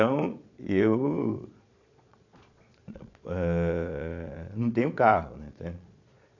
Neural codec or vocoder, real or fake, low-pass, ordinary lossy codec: vocoder, 22.05 kHz, 80 mel bands, Vocos; fake; 7.2 kHz; none